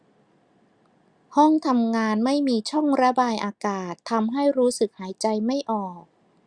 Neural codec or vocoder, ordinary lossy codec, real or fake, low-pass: none; Opus, 64 kbps; real; 9.9 kHz